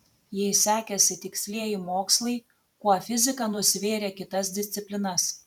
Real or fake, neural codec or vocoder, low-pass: fake; vocoder, 44.1 kHz, 128 mel bands every 512 samples, BigVGAN v2; 19.8 kHz